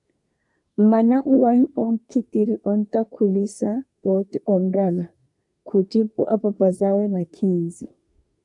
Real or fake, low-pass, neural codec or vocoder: fake; 10.8 kHz; codec, 24 kHz, 1 kbps, SNAC